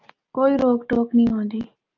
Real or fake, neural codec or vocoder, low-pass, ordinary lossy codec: real; none; 7.2 kHz; Opus, 24 kbps